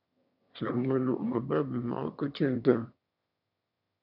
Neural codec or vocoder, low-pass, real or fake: autoencoder, 22.05 kHz, a latent of 192 numbers a frame, VITS, trained on one speaker; 5.4 kHz; fake